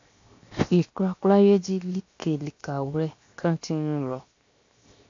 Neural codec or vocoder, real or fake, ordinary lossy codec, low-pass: codec, 16 kHz, 0.7 kbps, FocalCodec; fake; AAC, 48 kbps; 7.2 kHz